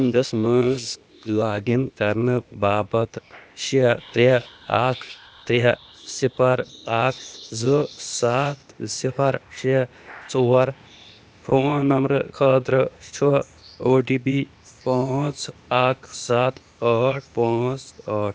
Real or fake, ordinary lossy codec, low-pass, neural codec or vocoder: fake; none; none; codec, 16 kHz, 0.8 kbps, ZipCodec